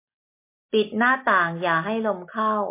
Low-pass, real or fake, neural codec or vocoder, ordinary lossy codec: 3.6 kHz; real; none; MP3, 24 kbps